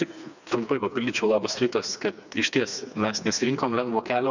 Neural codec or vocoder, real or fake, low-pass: codec, 16 kHz, 2 kbps, FreqCodec, smaller model; fake; 7.2 kHz